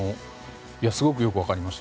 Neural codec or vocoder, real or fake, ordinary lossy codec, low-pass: none; real; none; none